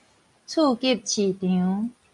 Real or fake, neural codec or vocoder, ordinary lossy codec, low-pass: real; none; MP3, 64 kbps; 10.8 kHz